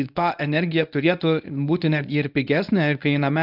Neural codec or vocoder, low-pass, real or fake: codec, 24 kHz, 0.9 kbps, WavTokenizer, medium speech release version 1; 5.4 kHz; fake